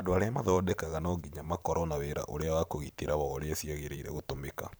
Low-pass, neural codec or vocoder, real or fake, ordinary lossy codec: none; vocoder, 44.1 kHz, 128 mel bands every 512 samples, BigVGAN v2; fake; none